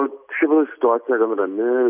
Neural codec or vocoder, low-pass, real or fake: none; 3.6 kHz; real